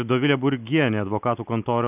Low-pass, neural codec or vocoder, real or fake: 3.6 kHz; none; real